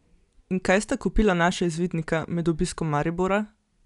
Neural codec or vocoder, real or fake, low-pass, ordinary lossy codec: none; real; 10.8 kHz; none